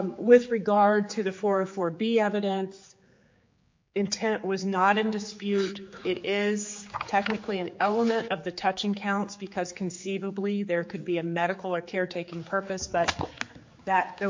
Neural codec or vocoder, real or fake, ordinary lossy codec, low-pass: codec, 16 kHz, 4 kbps, X-Codec, HuBERT features, trained on general audio; fake; MP3, 48 kbps; 7.2 kHz